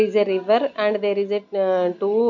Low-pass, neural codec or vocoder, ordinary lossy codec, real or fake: 7.2 kHz; none; none; real